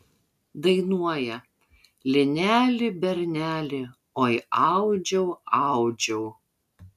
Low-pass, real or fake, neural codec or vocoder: 14.4 kHz; real; none